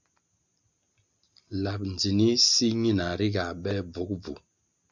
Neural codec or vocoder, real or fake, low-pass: none; real; 7.2 kHz